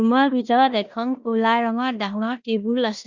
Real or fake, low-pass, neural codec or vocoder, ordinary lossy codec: fake; 7.2 kHz; codec, 16 kHz in and 24 kHz out, 0.9 kbps, LongCat-Audio-Codec, four codebook decoder; Opus, 64 kbps